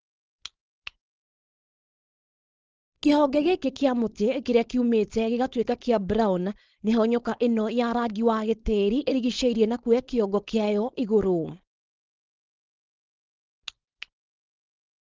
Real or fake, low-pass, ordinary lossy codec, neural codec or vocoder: fake; 7.2 kHz; Opus, 16 kbps; codec, 16 kHz, 4.8 kbps, FACodec